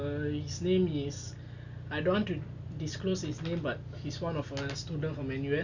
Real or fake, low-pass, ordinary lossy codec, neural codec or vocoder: real; 7.2 kHz; none; none